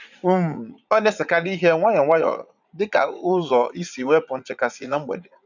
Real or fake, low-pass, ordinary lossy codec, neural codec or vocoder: fake; 7.2 kHz; none; vocoder, 44.1 kHz, 80 mel bands, Vocos